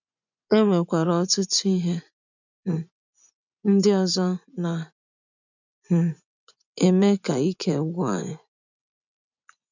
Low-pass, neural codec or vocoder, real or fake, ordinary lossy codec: 7.2 kHz; vocoder, 24 kHz, 100 mel bands, Vocos; fake; none